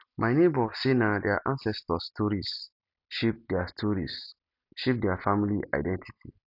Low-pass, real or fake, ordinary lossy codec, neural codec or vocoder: 5.4 kHz; real; none; none